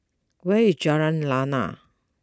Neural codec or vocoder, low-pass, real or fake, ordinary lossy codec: none; none; real; none